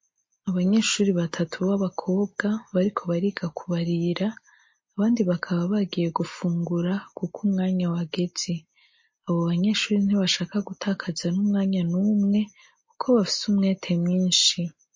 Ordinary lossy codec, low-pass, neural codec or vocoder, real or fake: MP3, 32 kbps; 7.2 kHz; none; real